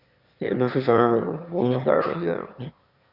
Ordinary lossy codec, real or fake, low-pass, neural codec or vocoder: Opus, 64 kbps; fake; 5.4 kHz; autoencoder, 22.05 kHz, a latent of 192 numbers a frame, VITS, trained on one speaker